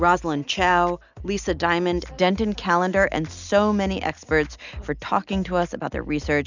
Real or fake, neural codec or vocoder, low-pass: real; none; 7.2 kHz